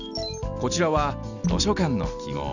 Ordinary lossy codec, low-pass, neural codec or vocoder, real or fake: none; 7.2 kHz; none; real